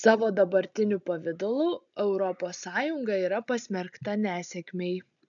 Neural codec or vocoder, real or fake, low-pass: none; real; 7.2 kHz